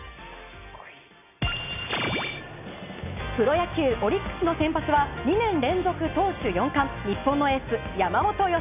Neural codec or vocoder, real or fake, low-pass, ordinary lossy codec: none; real; 3.6 kHz; none